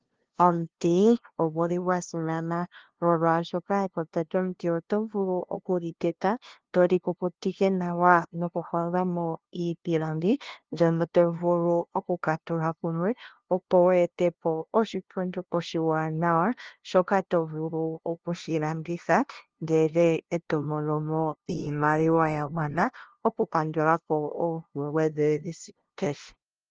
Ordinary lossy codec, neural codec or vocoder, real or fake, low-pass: Opus, 16 kbps; codec, 16 kHz, 0.5 kbps, FunCodec, trained on LibriTTS, 25 frames a second; fake; 7.2 kHz